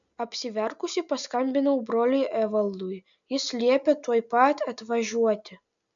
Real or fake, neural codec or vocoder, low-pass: real; none; 7.2 kHz